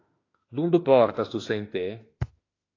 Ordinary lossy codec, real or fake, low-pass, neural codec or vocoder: AAC, 32 kbps; fake; 7.2 kHz; autoencoder, 48 kHz, 32 numbers a frame, DAC-VAE, trained on Japanese speech